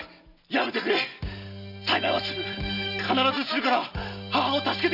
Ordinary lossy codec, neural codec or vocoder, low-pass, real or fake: none; none; 5.4 kHz; real